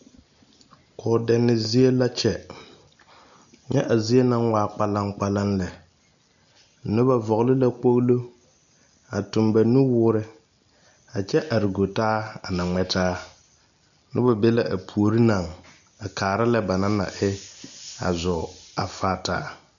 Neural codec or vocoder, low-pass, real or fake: none; 7.2 kHz; real